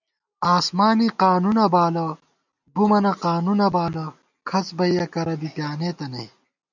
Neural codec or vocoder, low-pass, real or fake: none; 7.2 kHz; real